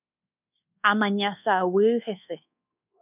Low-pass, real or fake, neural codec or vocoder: 3.6 kHz; fake; codec, 24 kHz, 1.2 kbps, DualCodec